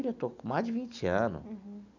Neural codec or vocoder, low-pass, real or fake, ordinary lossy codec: none; 7.2 kHz; real; none